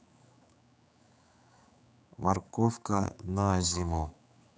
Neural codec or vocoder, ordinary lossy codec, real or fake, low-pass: codec, 16 kHz, 4 kbps, X-Codec, HuBERT features, trained on general audio; none; fake; none